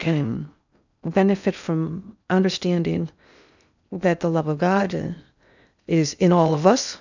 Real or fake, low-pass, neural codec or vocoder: fake; 7.2 kHz; codec, 16 kHz in and 24 kHz out, 0.6 kbps, FocalCodec, streaming, 2048 codes